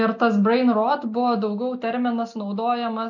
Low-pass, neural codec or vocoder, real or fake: 7.2 kHz; none; real